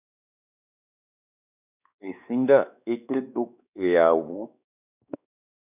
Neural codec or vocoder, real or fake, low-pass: codec, 16 kHz, 2 kbps, X-Codec, WavLM features, trained on Multilingual LibriSpeech; fake; 3.6 kHz